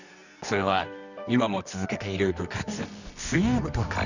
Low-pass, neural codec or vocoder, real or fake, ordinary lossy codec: 7.2 kHz; codec, 24 kHz, 0.9 kbps, WavTokenizer, medium music audio release; fake; none